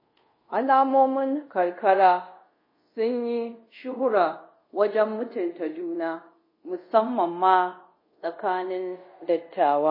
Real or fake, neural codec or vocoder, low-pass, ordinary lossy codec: fake; codec, 24 kHz, 0.5 kbps, DualCodec; 5.4 kHz; MP3, 24 kbps